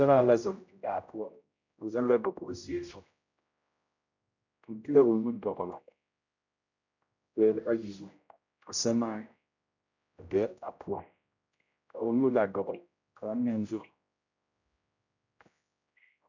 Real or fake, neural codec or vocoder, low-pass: fake; codec, 16 kHz, 0.5 kbps, X-Codec, HuBERT features, trained on general audio; 7.2 kHz